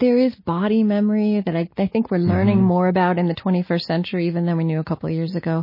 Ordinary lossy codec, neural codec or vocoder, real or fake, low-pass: MP3, 24 kbps; none; real; 5.4 kHz